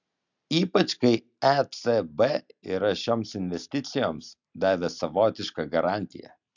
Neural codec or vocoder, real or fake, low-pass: none; real; 7.2 kHz